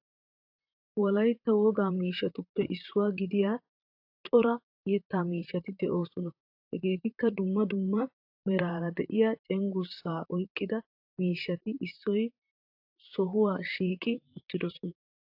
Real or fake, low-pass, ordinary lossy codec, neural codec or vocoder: fake; 5.4 kHz; AAC, 48 kbps; vocoder, 44.1 kHz, 128 mel bands, Pupu-Vocoder